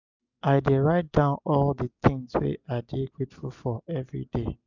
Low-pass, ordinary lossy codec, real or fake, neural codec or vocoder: 7.2 kHz; none; real; none